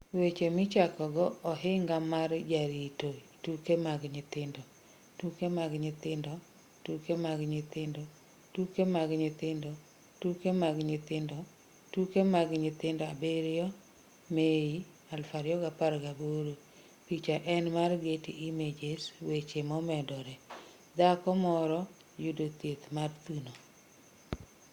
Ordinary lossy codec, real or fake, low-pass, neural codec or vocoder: Opus, 64 kbps; real; 19.8 kHz; none